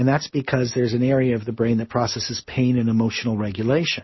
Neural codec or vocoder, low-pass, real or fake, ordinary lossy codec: none; 7.2 kHz; real; MP3, 24 kbps